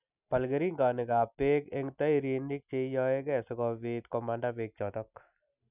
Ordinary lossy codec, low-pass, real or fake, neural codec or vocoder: none; 3.6 kHz; real; none